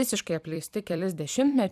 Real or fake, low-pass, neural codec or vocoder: fake; 14.4 kHz; vocoder, 44.1 kHz, 128 mel bands every 256 samples, BigVGAN v2